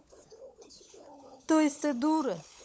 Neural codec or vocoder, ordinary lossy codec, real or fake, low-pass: codec, 16 kHz, 16 kbps, FunCodec, trained on LibriTTS, 50 frames a second; none; fake; none